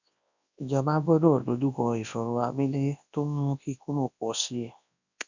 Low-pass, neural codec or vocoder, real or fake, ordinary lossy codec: 7.2 kHz; codec, 24 kHz, 0.9 kbps, WavTokenizer, large speech release; fake; none